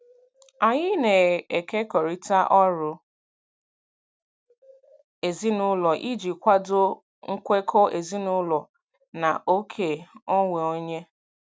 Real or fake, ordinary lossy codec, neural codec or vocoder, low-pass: real; none; none; none